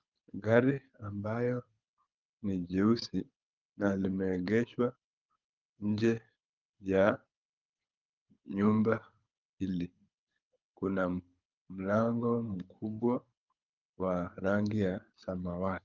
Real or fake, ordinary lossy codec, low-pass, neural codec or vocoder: fake; Opus, 24 kbps; 7.2 kHz; codec, 24 kHz, 6 kbps, HILCodec